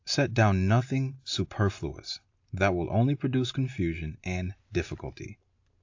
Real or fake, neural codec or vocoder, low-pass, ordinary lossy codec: real; none; 7.2 kHz; AAC, 48 kbps